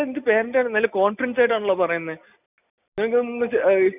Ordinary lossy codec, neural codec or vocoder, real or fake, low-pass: none; none; real; 3.6 kHz